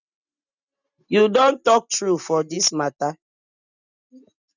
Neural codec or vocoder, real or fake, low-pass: none; real; 7.2 kHz